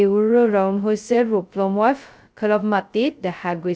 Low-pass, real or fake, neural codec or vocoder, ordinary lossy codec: none; fake; codec, 16 kHz, 0.2 kbps, FocalCodec; none